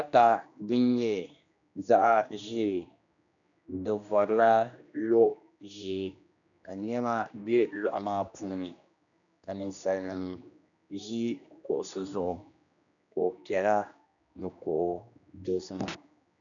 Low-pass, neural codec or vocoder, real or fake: 7.2 kHz; codec, 16 kHz, 1 kbps, X-Codec, HuBERT features, trained on general audio; fake